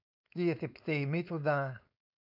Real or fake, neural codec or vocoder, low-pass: fake; codec, 16 kHz, 4.8 kbps, FACodec; 5.4 kHz